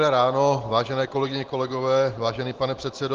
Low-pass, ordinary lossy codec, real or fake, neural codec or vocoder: 7.2 kHz; Opus, 16 kbps; real; none